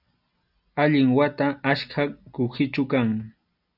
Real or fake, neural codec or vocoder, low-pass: real; none; 5.4 kHz